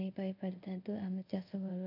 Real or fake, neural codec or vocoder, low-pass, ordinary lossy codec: fake; codec, 24 kHz, 0.5 kbps, DualCodec; 5.4 kHz; none